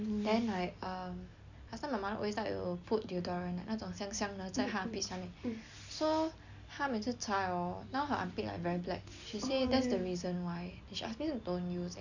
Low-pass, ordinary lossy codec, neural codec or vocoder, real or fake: 7.2 kHz; none; none; real